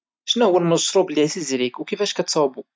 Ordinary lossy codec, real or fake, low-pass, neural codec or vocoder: none; real; none; none